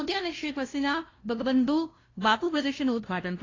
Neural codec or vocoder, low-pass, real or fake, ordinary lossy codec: codec, 16 kHz, 0.5 kbps, FunCodec, trained on LibriTTS, 25 frames a second; 7.2 kHz; fake; AAC, 32 kbps